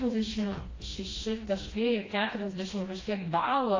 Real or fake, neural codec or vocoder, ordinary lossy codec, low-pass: fake; codec, 16 kHz, 1 kbps, FreqCodec, smaller model; AAC, 32 kbps; 7.2 kHz